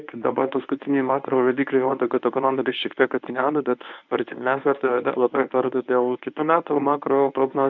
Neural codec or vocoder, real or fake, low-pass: codec, 24 kHz, 0.9 kbps, WavTokenizer, medium speech release version 1; fake; 7.2 kHz